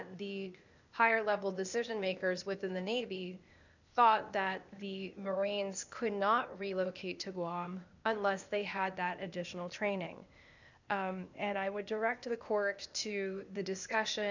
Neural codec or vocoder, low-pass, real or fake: codec, 16 kHz, 0.8 kbps, ZipCodec; 7.2 kHz; fake